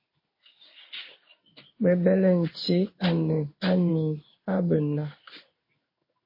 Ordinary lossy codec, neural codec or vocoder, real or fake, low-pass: MP3, 24 kbps; codec, 16 kHz in and 24 kHz out, 1 kbps, XY-Tokenizer; fake; 5.4 kHz